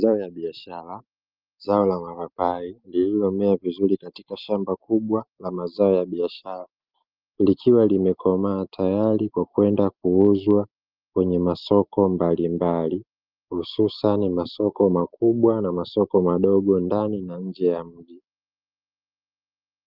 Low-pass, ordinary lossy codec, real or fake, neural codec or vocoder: 5.4 kHz; Opus, 24 kbps; real; none